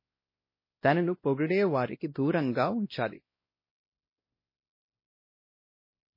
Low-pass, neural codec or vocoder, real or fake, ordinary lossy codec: 5.4 kHz; codec, 16 kHz, 1 kbps, X-Codec, WavLM features, trained on Multilingual LibriSpeech; fake; MP3, 24 kbps